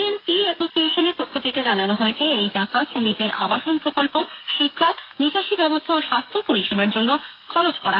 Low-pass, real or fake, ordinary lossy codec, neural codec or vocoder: 5.4 kHz; fake; AAC, 32 kbps; codec, 32 kHz, 1.9 kbps, SNAC